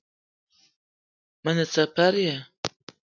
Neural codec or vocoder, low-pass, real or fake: none; 7.2 kHz; real